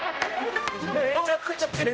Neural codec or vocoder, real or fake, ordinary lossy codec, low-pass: codec, 16 kHz, 0.5 kbps, X-Codec, HuBERT features, trained on general audio; fake; none; none